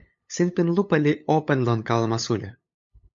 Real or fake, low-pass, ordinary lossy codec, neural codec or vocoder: fake; 7.2 kHz; MP3, 48 kbps; codec, 16 kHz, 8 kbps, FunCodec, trained on LibriTTS, 25 frames a second